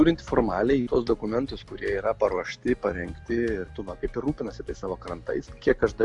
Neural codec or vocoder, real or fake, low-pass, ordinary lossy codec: none; real; 10.8 kHz; AAC, 64 kbps